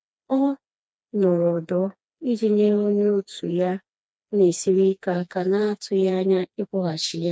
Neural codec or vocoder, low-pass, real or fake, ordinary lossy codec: codec, 16 kHz, 2 kbps, FreqCodec, smaller model; none; fake; none